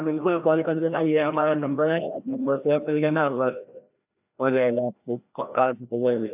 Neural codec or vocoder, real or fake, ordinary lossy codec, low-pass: codec, 16 kHz, 1 kbps, FreqCodec, larger model; fake; AAC, 32 kbps; 3.6 kHz